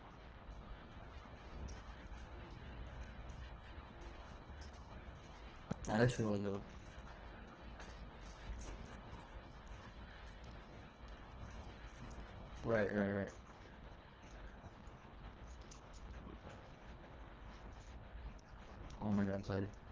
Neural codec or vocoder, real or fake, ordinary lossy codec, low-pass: codec, 24 kHz, 1.5 kbps, HILCodec; fake; Opus, 16 kbps; 7.2 kHz